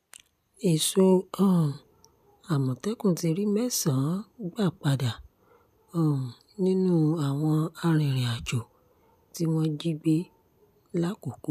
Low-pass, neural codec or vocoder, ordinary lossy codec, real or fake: 14.4 kHz; none; none; real